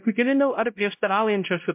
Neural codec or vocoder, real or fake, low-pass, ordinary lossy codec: codec, 16 kHz, 0.5 kbps, X-Codec, WavLM features, trained on Multilingual LibriSpeech; fake; 3.6 kHz; MP3, 32 kbps